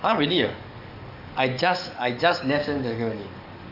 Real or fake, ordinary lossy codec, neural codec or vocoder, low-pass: fake; none; codec, 44.1 kHz, 7.8 kbps, DAC; 5.4 kHz